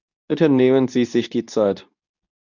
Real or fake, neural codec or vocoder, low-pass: fake; codec, 24 kHz, 0.9 kbps, WavTokenizer, medium speech release version 2; 7.2 kHz